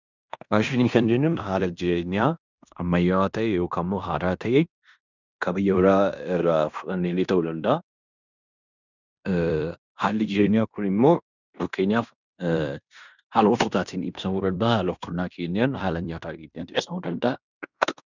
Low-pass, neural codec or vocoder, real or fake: 7.2 kHz; codec, 16 kHz in and 24 kHz out, 0.9 kbps, LongCat-Audio-Codec, fine tuned four codebook decoder; fake